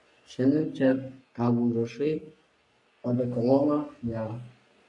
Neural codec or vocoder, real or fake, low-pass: codec, 44.1 kHz, 3.4 kbps, Pupu-Codec; fake; 10.8 kHz